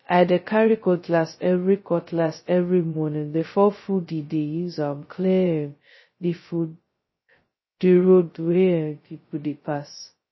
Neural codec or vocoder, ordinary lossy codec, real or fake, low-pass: codec, 16 kHz, 0.2 kbps, FocalCodec; MP3, 24 kbps; fake; 7.2 kHz